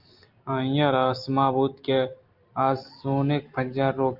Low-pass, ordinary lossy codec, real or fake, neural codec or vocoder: 5.4 kHz; Opus, 24 kbps; real; none